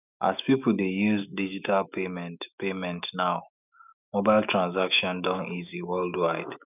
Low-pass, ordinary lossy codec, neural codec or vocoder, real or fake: 3.6 kHz; none; autoencoder, 48 kHz, 128 numbers a frame, DAC-VAE, trained on Japanese speech; fake